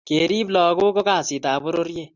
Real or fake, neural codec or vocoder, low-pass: real; none; 7.2 kHz